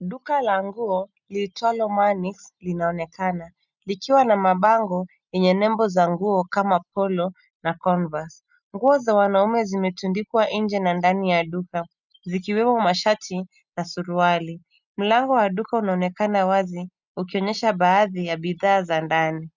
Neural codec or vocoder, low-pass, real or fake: none; 7.2 kHz; real